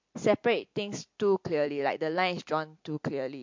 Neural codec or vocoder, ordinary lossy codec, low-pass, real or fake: vocoder, 44.1 kHz, 128 mel bands every 256 samples, BigVGAN v2; MP3, 48 kbps; 7.2 kHz; fake